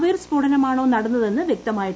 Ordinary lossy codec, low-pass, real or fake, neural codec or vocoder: none; none; real; none